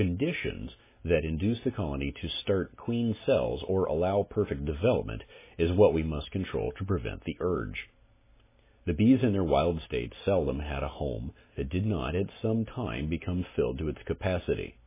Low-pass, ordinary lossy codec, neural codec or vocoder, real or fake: 3.6 kHz; MP3, 16 kbps; none; real